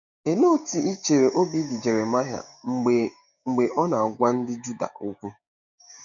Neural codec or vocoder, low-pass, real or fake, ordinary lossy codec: codec, 16 kHz, 6 kbps, DAC; 7.2 kHz; fake; none